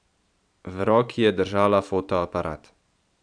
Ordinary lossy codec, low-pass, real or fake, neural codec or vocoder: none; 9.9 kHz; fake; vocoder, 44.1 kHz, 128 mel bands every 512 samples, BigVGAN v2